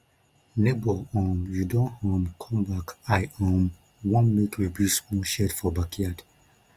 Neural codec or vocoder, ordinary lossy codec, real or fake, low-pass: none; Opus, 32 kbps; real; 14.4 kHz